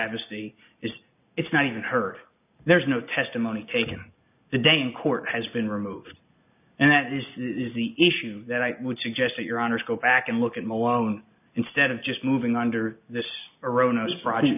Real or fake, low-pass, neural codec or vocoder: real; 3.6 kHz; none